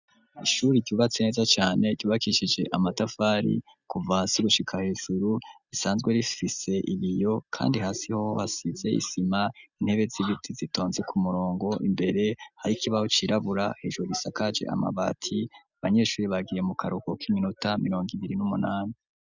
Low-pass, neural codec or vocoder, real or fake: 7.2 kHz; none; real